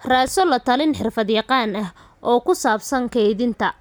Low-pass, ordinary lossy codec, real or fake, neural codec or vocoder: none; none; real; none